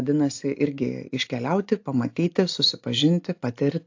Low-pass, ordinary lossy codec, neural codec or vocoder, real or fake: 7.2 kHz; AAC, 48 kbps; none; real